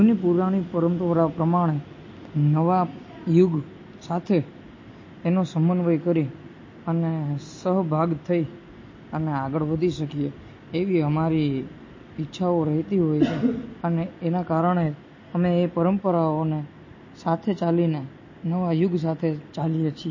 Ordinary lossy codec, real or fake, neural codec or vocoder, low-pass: MP3, 32 kbps; real; none; 7.2 kHz